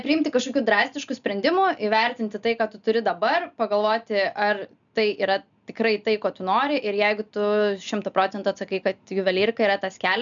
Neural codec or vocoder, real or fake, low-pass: none; real; 7.2 kHz